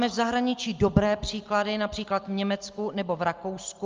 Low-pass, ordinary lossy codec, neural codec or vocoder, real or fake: 7.2 kHz; Opus, 32 kbps; none; real